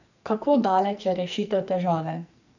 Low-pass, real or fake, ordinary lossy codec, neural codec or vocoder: 7.2 kHz; fake; none; codec, 44.1 kHz, 2.6 kbps, SNAC